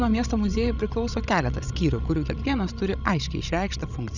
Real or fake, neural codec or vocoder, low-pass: fake; codec, 16 kHz, 16 kbps, FreqCodec, larger model; 7.2 kHz